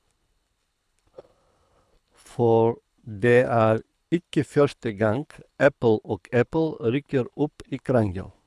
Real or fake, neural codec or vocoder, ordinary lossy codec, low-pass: fake; codec, 24 kHz, 6 kbps, HILCodec; none; none